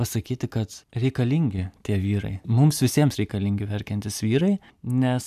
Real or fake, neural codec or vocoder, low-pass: real; none; 14.4 kHz